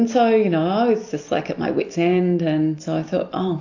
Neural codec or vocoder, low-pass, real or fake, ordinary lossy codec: none; 7.2 kHz; real; AAC, 48 kbps